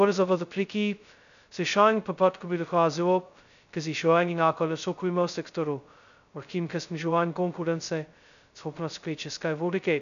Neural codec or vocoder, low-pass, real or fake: codec, 16 kHz, 0.2 kbps, FocalCodec; 7.2 kHz; fake